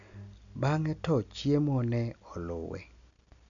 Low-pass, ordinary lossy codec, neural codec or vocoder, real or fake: 7.2 kHz; none; none; real